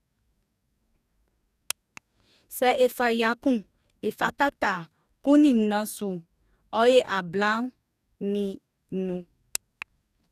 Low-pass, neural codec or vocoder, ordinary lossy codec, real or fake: 14.4 kHz; codec, 44.1 kHz, 2.6 kbps, DAC; none; fake